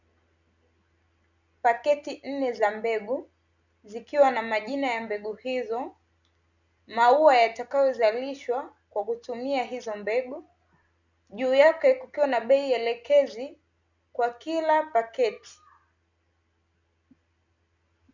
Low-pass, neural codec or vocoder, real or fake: 7.2 kHz; none; real